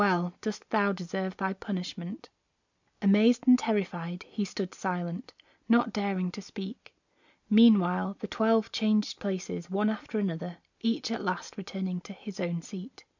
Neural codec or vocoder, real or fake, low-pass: none; real; 7.2 kHz